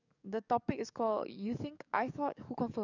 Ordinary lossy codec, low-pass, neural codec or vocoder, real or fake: none; 7.2 kHz; codec, 44.1 kHz, 7.8 kbps, DAC; fake